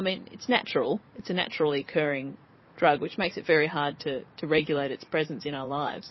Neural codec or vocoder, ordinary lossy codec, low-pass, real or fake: vocoder, 44.1 kHz, 80 mel bands, Vocos; MP3, 24 kbps; 7.2 kHz; fake